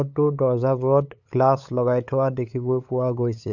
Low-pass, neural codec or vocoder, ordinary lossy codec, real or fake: 7.2 kHz; codec, 16 kHz, 8 kbps, FunCodec, trained on LibriTTS, 25 frames a second; none; fake